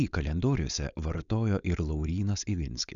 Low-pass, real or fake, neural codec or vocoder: 7.2 kHz; fake; codec, 16 kHz, 4.8 kbps, FACodec